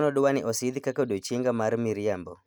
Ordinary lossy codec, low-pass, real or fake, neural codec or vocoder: none; none; real; none